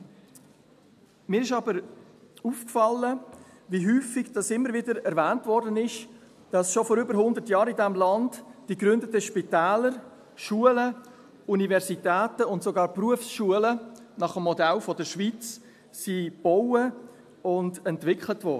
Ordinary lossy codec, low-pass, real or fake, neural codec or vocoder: AAC, 96 kbps; 14.4 kHz; real; none